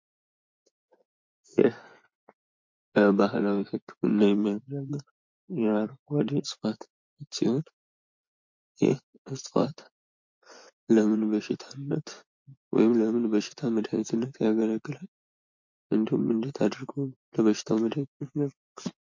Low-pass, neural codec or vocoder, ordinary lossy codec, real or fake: 7.2 kHz; vocoder, 24 kHz, 100 mel bands, Vocos; MP3, 48 kbps; fake